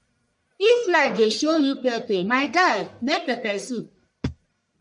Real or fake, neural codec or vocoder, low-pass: fake; codec, 44.1 kHz, 1.7 kbps, Pupu-Codec; 10.8 kHz